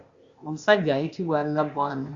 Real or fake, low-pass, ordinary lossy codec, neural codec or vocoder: fake; 7.2 kHz; Opus, 64 kbps; codec, 16 kHz, 0.8 kbps, ZipCodec